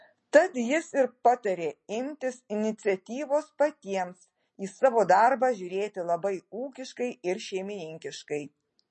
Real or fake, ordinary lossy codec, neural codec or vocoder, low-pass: real; MP3, 32 kbps; none; 10.8 kHz